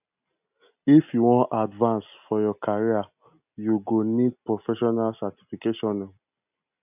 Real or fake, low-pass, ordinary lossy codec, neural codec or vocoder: real; 3.6 kHz; none; none